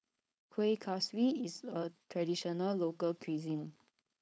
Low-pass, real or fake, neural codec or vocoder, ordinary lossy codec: none; fake; codec, 16 kHz, 4.8 kbps, FACodec; none